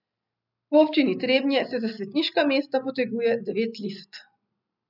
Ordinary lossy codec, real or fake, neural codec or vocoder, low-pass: none; real; none; 5.4 kHz